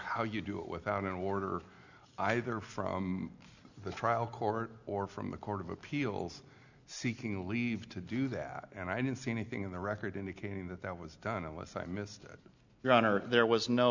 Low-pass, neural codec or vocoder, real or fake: 7.2 kHz; none; real